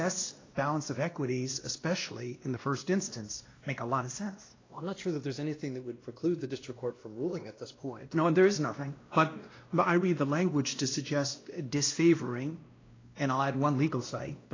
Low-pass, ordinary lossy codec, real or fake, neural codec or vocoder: 7.2 kHz; AAC, 32 kbps; fake; codec, 16 kHz, 1 kbps, X-Codec, WavLM features, trained on Multilingual LibriSpeech